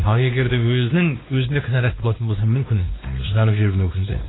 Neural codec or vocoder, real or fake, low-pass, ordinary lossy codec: codec, 16 kHz, 2 kbps, X-Codec, WavLM features, trained on Multilingual LibriSpeech; fake; 7.2 kHz; AAC, 16 kbps